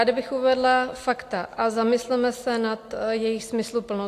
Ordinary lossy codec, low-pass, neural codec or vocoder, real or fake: AAC, 64 kbps; 14.4 kHz; none; real